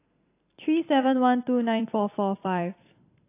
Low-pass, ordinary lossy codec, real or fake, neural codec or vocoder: 3.6 kHz; AAC, 24 kbps; fake; vocoder, 44.1 kHz, 128 mel bands every 256 samples, BigVGAN v2